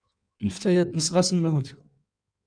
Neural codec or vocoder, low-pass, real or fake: codec, 16 kHz in and 24 kHz out, 1.1 kbps, FireRedTTS-2 codec; 9.9 kHz; fake